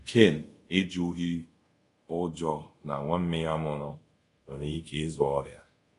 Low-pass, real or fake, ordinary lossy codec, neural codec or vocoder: 10.8 kHz; fake; AAC, 48 kbps; codec, 24 kHz, 0.5 kbps, DualCodec